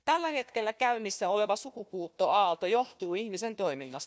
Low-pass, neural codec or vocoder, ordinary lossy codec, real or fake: none; codec, 16 kHz, 1 kbps, FunCodec, trained on Chinese and English, 50 frames a second; none; fake